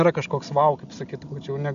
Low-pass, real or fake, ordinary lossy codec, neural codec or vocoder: 7.2 kHz; real; AAC, 96 kbps; none